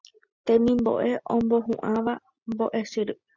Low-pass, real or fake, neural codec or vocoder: 7.2 kHz; real; none